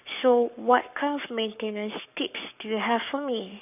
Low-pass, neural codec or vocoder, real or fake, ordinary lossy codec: 3.6 kHz; codec, 44.1 kHz, 7.8 kbps, Pupu-Codec; fake; none